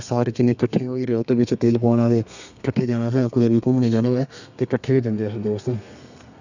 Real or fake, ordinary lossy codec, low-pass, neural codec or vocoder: fake; none; 7.2 kHz; codec, 32 kHz, 1.9 kbps, SNAC